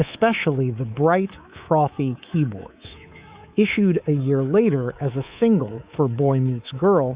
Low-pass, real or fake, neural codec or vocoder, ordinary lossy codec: 3.6 kHz; fake; codec, 24 kHz, 3.1 kbps, DualCodec; Opus, 64 kbps